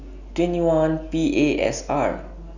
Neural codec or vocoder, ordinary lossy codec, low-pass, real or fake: none; none; 7.2 kHz; real